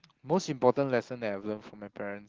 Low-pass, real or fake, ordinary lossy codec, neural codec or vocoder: 7.2 kHz; real; Opus, 16 kbps; none